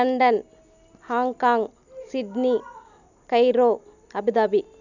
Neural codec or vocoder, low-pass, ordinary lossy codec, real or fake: none; 7.2 kHz; none; real